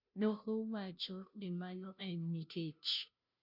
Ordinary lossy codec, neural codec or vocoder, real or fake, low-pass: none; codec, 16 kHz, 0.5 kbps, FunCodec, trained on Chinese and English, 25 frames a second; fake; 5.4 kHz